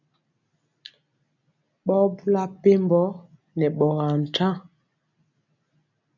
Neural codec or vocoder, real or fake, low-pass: none; real; 7.2 kHz